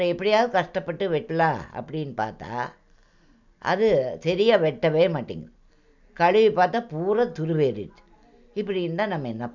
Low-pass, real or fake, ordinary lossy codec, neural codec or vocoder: 7.2 kHz; real; none; none